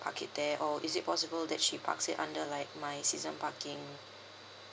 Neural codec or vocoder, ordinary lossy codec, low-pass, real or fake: none; none; none; real